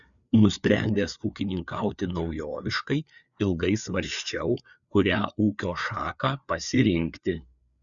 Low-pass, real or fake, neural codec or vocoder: 7.2 kHz; fake; codec, 16 kHz, 4 kbps, FreqCodec, larger model